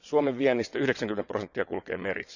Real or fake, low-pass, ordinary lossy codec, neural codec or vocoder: fake; 7.2 kHz; none; vocoder, 22.05 kHz, 80 mel bands, WaveNeXt